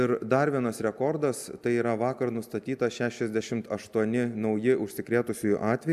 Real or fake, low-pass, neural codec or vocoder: real; 14.4 kHz; none